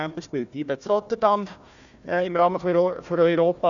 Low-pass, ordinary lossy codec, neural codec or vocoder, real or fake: 7.2 kHz; none; codec, 16 kHz, 1 kbps, FunCodec, trained on Chinese and English, 50 frames a second; fake